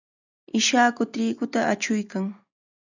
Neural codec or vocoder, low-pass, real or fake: none; 7.2 kHz; real